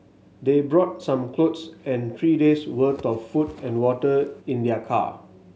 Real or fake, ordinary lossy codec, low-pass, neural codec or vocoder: real; none; none; none